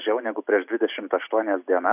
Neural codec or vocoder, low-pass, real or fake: none; 3.6 kHz; real